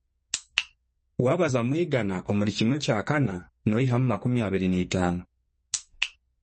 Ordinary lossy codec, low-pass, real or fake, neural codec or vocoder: MP3, 32 kbps; 10.8 kHz; fake; codec, 44.1 kHz, 2.6 kbps, SNAC